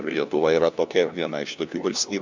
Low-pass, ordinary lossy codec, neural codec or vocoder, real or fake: 7.2 kHz; MP3, 64 kbps; codec, 16 kHz, 1 kbps, FunCodec, trained on LibriTTS, 50 frames a second; fake